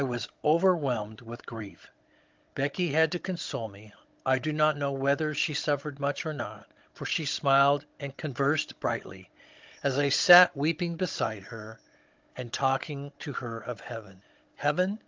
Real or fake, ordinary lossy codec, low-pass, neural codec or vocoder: fake; Opus, 32 kbps; 7.2 kHz; codec, 16 kHz, 16 kbps, FunCodec, trained on Chinese and English, 50 frames a second